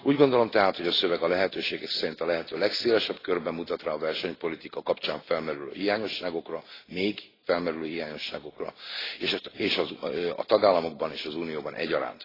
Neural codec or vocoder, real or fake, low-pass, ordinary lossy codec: none; real; 5.4 kHz; AAC, 24 kbps